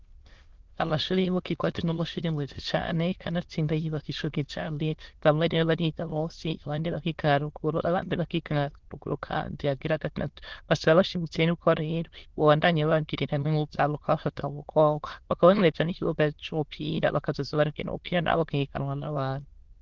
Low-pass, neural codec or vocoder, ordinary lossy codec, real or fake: 7.2 kHz; autoencoder, 22.05 kHz, a latent of 192 numbers a frame, VITS, trained on many speakers; Opus, 16 kbps; fake